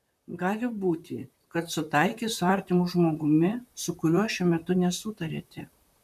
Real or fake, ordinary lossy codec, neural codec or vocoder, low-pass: fake; MP3, 96 kbps; vocoder, 44.1 kHz, 128 mel bands, Pupu-Vocoder; 14.4 kHz